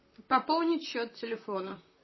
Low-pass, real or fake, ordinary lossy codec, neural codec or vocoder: 7.2 kHz; fake; MP3, 24 kbps; vocoder, 44.1 kHz, 128 mel bands, Pupu-Vocoder